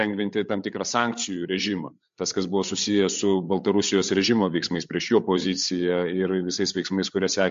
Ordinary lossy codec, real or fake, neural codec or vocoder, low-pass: MP3, 48 kbps; fake; codec, 16 kHz, 8 kbps, FreqCodec, larger model; 7.2 kHz